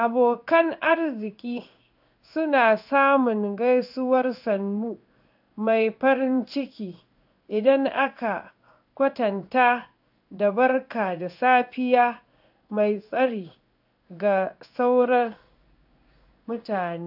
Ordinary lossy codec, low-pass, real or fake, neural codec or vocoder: AAC, 48 kbps; 5.4 kHz; fake; codec, 16 kHz in and 24 kHz out, 1 kbps, XY-Tokenizer